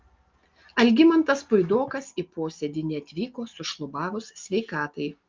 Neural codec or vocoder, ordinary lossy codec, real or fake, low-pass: none; Opus, 24 kbps; real; 7.2 kHz